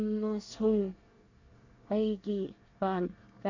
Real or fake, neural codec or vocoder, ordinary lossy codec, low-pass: fake; codec, 24 kHz, 1 kbps, SNAC; AAC, 48 kbps; 7.2 kHz